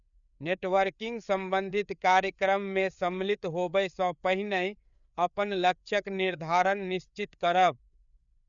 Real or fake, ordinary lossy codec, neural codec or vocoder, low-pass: fake; none; codec, 16 kHz, 4 kbps, FreqCodec, larger model; 7.2 kHz